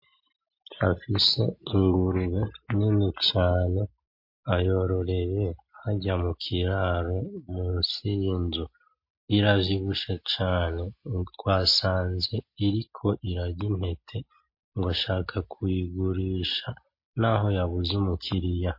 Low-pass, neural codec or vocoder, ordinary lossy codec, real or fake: 5.4 kHz; none; MP3, 32 kbps; real